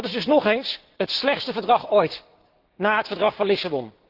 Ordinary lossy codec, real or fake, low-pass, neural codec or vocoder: Opus, 16 kbps; fake; 5.4 kHz; codec, 16 kHz, 6 kbps, DAC